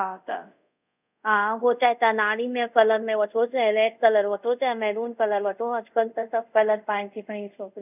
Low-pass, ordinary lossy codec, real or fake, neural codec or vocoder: 3.6 kHz; none; fake; codec, 24 kHz, 0.5 kbps, DualCodec